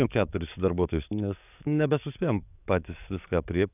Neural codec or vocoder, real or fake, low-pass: codec, 44.1 kHz, 7.8 kbps, DAC; fake; 3.6 kHz